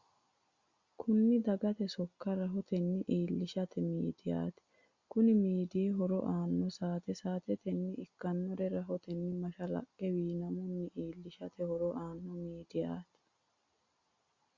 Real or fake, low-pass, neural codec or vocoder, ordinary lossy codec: real; 7.2 kHz; none; Opus, 64 kbps